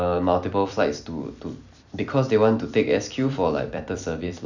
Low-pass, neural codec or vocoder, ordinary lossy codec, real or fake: 7.2 kHz; vocoder, 44.1 kHz, 128 mel bands every 256 samples, BigVGAN v2; none; fake